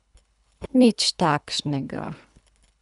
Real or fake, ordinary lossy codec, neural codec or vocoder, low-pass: fake; none; codec, 24 kHz, 3 kbps, HILCodec; 10.8 kHz